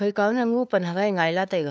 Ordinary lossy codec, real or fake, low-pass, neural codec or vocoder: none; fake; none; codec, 16 kHz, 2 kbps, FunCodec, trained on LibriTTS, 25 frames a second